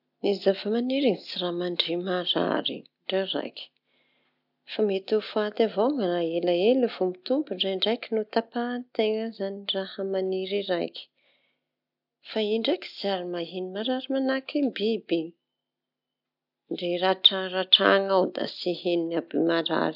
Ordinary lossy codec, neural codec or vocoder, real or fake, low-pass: none; none; real; 5.4 kHz